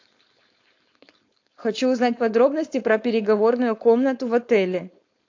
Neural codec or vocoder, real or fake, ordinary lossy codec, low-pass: codec, 16 kHz, 4.8 kbps, FACodec; fake; AAC, 48 kbps; 7.2 kHz